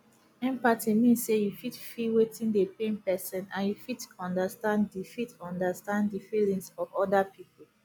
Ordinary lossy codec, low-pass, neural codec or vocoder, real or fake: none; none; none; real